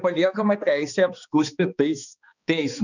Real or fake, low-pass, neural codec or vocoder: fake; 7.2 kHz; codec, 16 kHz, 2 kbps, X-Codec, HuBERT features, trained on balanced general audio